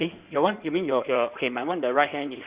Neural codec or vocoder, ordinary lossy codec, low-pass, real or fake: codec, 16 kHz in and 24 kHz out, 2.2 kbps, FireRedTTS-2 codec; Opus, 64 kbps; 3.6 kHz; fake